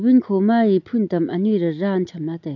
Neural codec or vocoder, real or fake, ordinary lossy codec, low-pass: none; real; AAC, 48 kbps; 7.2 kHz